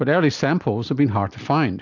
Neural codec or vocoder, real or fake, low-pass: none; real; 7.2 kHz